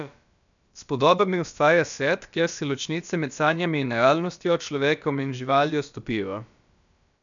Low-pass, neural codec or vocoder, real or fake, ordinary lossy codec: 7.2 kHz; codec, 16 kHz, about 1 kbps, DyCAST, with the encoder's durations; fake; none